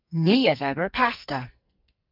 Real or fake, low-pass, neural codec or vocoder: fake; 5.4 kHz; codec, 44.1 kHz, 2.6 kbps, SNAC